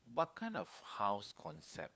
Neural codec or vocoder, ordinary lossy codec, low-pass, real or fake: codec, 16 kHz, 4 kbps, FunCodec, trained on LibriTTS, 50 frames a second; none; none; fake